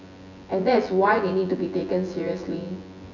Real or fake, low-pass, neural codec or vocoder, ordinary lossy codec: fake; 7.2 kHz; vocoder, 24 kHz, 100 mel bands, Vocos; none